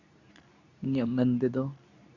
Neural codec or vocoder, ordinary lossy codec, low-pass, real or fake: codec, 24 kHz, 0.9 kbps, WavTokenizer, medium speech release version 2; none; 7.2 kHz; fake